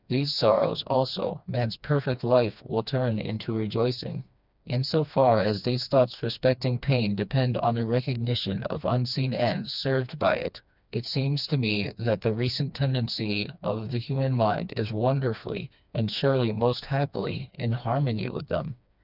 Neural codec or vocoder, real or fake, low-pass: codec, 16 kHz, 2 kbps, FreqCodec, smaller model; fake; 5.4 kHz